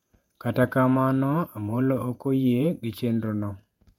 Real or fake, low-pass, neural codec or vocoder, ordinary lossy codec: fake; 19.8 kHz; vocoder, 48 kHz, 128 mel bands, Vocos; MP3, 64 kbps